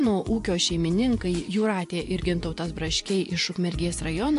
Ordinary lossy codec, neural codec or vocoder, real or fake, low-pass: AAC, 64 kbps; vocoder, 24 kHz, 100 mel bands, Vocos; fake; 10.8 kHz